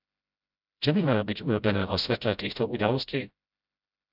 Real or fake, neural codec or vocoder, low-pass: fake; codec, 16 kHz, 0.5 kbps, FreqCodec, smaller model; 5.4 kHz